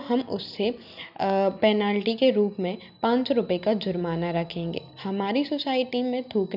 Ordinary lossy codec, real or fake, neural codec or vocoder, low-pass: none; real; none; 5.4 kHz